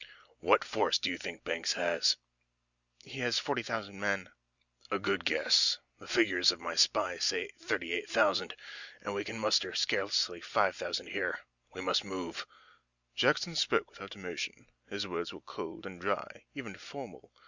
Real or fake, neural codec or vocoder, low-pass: real; none; 7.2 kHz